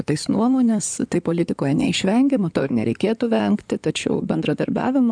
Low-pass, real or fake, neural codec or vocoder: 9.9 kHz; fake; codec, 16 kHz in and 24 kHz out, 2.2 kbps, FireRedTTS-2 codec